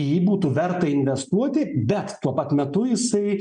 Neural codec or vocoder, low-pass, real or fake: none; 9.9 kHz; real